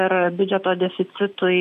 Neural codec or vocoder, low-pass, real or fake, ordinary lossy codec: none; 14.4 kHz; real; AAC, 64 kbps